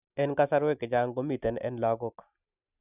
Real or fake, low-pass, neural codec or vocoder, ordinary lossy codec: real; 3.6 kHz; none; none